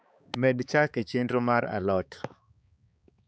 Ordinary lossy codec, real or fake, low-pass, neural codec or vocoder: none; fake; none; codec, 16 kHz, 4 kbps, X-Codec, HuBERT features, trained on balanced general audio